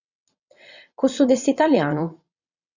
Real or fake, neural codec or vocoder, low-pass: fake; vocoder, 22.05 kHz, 80 mel bands, WaveNeXt; 7.2 kHz